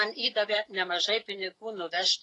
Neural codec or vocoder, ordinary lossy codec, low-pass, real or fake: autoencoder, 48 kHz, 128 numbers a frame, DAC-VAE, trained on Japanese speech; AAC, 32 kbps; 10.8 kHz; fake